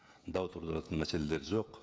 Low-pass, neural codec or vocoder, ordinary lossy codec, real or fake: none; codec, 16 kHz, 16 kbps, FreqCodec, smaller model; none; fake